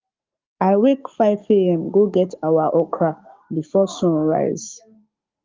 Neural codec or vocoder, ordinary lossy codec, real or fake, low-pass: codec, 44.1 kHz, 7.8 kbps, DAC; Opus, 32 kbps; fake; 7.2 kHz